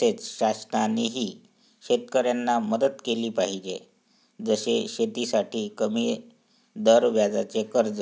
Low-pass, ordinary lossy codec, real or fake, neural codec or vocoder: none; none; real; none